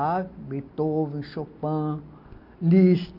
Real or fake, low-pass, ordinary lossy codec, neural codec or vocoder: real; 5.4 kHz; none; none